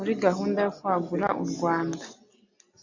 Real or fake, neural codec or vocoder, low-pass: real; none; 7.2 kHz